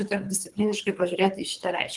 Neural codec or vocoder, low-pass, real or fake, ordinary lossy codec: codec, 24 kHz, 3 kbps, HILCodec; 10.8 kHz; fake; Opus, 32 kbps